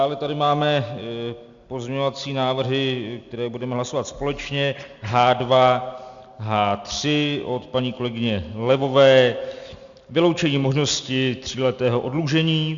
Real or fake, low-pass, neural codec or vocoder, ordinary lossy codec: real; 7.2 kHz; none; Opus, 64 kbps